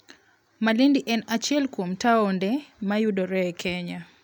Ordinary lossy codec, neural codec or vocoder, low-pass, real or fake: none; none; none; real